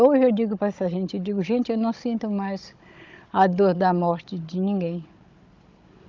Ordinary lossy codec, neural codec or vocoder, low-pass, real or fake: Opus, 24 kbps; codec, 16 kHz, 16 kbps, FunCodec, trained on Chinese and English, 50 frames a second; 7.2 kHz; fake